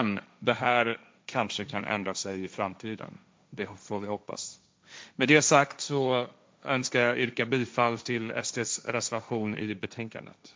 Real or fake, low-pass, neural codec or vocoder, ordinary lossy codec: fake; none; codec, 16 kHz, 1.1 kbps, Voila-Tokenizer; none